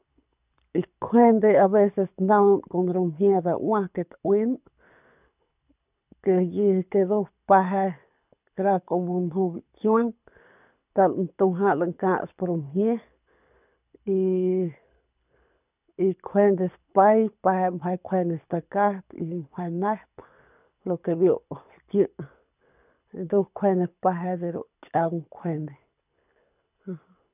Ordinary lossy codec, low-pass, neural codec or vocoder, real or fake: none; 3.6 kHz; codec, 24 kHz, 6 kbps, HILCodec; fake